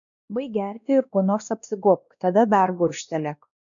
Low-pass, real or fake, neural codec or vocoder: 7.2 kHz; fake; codec, 16 kHz, 1 kbps, X-Codec, WavLM features, trained on Multilingual LibriSpeech